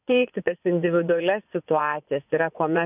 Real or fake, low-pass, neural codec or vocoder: fake; 3.6 kHz; vocoder, 24 kHz, 100 mel bands, Vocos